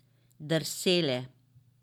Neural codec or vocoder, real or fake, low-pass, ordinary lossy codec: vocoder, 44.1 kHz, 128 mel bands every 512 samples, BigVGAN v2; fake; 19.8 kHz; none